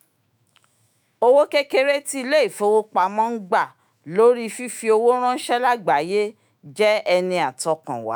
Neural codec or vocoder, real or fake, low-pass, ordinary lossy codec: autoencoder, 48 kHz, 128 numbers a frame, DAC-VAE, trained on Japanese speech; fake; none; none